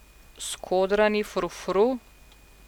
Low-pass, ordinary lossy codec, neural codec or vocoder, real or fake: 19.8 kHz; none; none; real